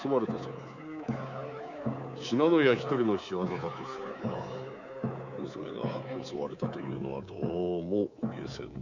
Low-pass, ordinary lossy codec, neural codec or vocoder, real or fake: 7.2 kHz; none; codec, 24 kHz, 3.1 kbps, DualCodec; fake